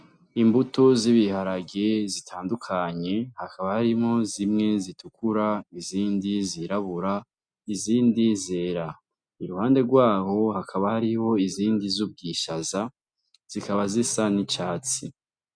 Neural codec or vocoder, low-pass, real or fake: none; 9.9 kHz; real